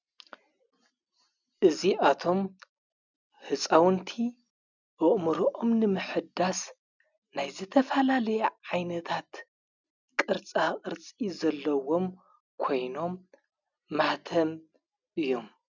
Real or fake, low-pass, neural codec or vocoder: real; 7.2 kHz; none